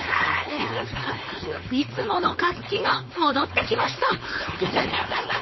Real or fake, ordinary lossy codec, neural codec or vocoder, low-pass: fake; MP3, 24 kbps; codec, 16 kHz, 4.8 kbps, FACodec; 7.2 kHz